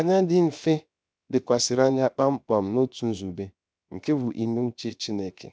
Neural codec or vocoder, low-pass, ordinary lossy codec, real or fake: codec, 16 kHz, 0.7 kbps, FocalCodec; none; none; fake